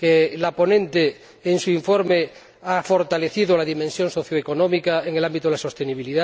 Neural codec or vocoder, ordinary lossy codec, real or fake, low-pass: none; none; real; none